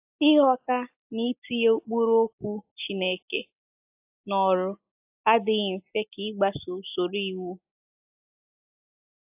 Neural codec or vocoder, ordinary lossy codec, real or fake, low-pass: none; AAC, 32 kbps; real; 3.6 kHz